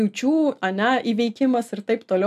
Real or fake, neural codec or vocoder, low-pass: real; none; 14.4 kHz